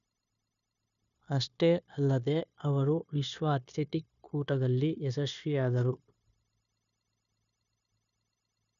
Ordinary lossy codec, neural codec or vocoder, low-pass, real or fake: none; codec, 16 kHz, 0.9 kbps, LongCat-Audio-Codec; 7.2 kHz; fake